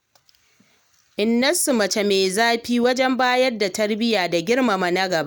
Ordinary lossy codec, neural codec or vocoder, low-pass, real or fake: none; none; none; real